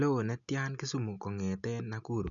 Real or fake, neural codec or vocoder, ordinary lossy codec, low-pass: real; none; none; 7.2 kHz